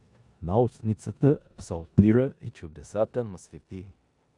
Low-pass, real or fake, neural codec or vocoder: 10.8 kHz; fake; codec, 16 kHz in and 24 kHz out, 0.9 kbps, LongCat-Audio-Codec, four codebook decoder